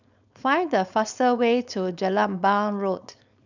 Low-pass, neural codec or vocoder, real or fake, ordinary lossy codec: 7.2 kHz; codec, 16 kHz, 4.8 kbps, FACodec; fake; none